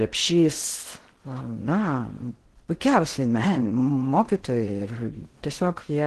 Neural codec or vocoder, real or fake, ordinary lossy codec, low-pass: codec, 16 kHz in and 24 kHz out, 0.6 kbps, FocalCodec, streaming, 4096 codes; fake; Opus, 16 kbps; 10.8 kHz